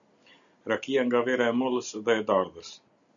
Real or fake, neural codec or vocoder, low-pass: real; none; 7.2 kHz